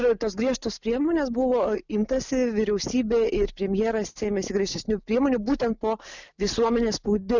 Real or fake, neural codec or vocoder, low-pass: real; none; 7.2 kHz